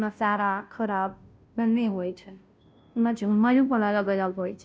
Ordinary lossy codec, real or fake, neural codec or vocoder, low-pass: none; fake; codec, 16 kHz, 0.5 kbps, FunCodec, trained on Chinese and English, 25 frames a second; none